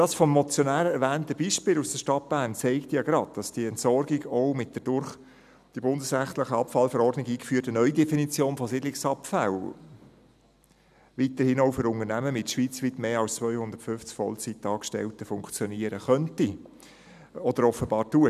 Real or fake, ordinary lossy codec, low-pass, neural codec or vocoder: real; none; 14.4 kHz; none